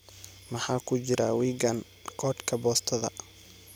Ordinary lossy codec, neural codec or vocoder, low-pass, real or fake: none; none; none; real